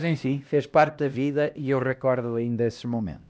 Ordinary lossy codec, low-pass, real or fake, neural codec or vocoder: none; none; fake; codec, 16 kHz, 1 kbps, X-Codec, HuBERT features, trained on LibriSpeech